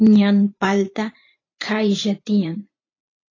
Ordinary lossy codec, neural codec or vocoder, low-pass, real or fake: AAC, 32 kbps; vocoder, 44.1 kHz, 128 mel bands every 512 samples, BigVGAN v2; 7.2 kHz; fake